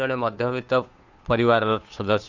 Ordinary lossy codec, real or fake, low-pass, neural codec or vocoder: Opus, 64 kbps; fake; 7.2 kHz; codec, 16 kHz, 2 kbps, FunCodec, trained on Chinese and English, 25 frames a second